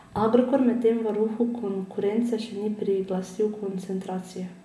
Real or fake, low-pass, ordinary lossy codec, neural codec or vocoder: real; none; none; none